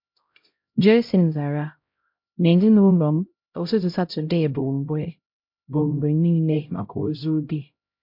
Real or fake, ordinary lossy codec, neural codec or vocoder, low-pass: fake; MP3, 48 kbps; codec, 16 kHz, 0.5 kbps, X-Codec, HuBERT features, trained on LibriSpeech; 5.4 kHz